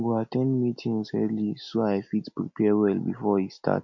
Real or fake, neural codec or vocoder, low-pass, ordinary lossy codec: real; none; 7.2 kHz; none